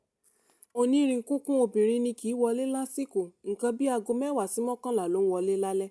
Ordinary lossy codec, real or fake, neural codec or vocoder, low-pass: none; real; none; none